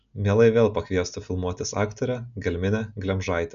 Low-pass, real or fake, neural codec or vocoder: 7.2 kHz; real; none